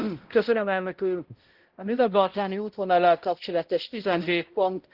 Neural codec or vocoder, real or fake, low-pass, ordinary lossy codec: codec, 16 kHz, 0.5 kbps, X-Codec, HuBERT features, trained on balanced general audio; fake; 5.4 kHz; Opus, 16 kbps